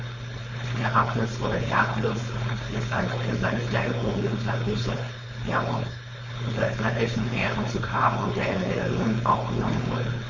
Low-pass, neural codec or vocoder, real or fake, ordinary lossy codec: 7.2 kHz; codec, 16 kHz, 4.8 kbps, FACodec; fake; MP3, 32 kbps